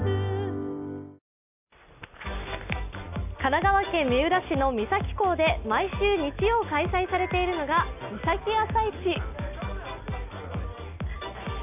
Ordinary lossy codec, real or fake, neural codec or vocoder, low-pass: none; real; none; 3.6 kHz